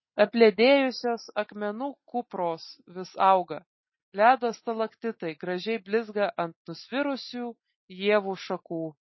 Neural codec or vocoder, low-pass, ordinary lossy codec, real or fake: none; 7.2 kHz; MP3, 24 kbps; real